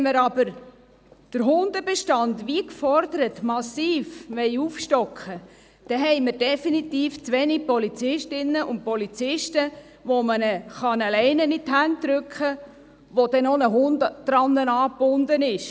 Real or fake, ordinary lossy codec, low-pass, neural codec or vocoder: real; none; none; none